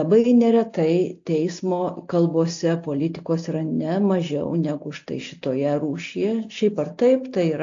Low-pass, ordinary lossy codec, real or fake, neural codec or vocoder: 7.2 kHz; AAC, 48 kbps; real; none